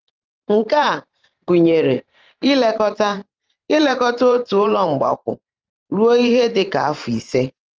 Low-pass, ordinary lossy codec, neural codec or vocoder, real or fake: 7.2 kHz; Opus, 24 kbps; vocoder, 44.1 kHz, 128 mel bands every 512 samples, BigVGAN v2; fake